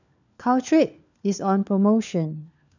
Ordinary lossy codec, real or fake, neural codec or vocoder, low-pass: none; fake; codec, 16 kHz, 4 kbps, FunCodec, trained on LibriTTS, 50 frames a second; 7.2 kHz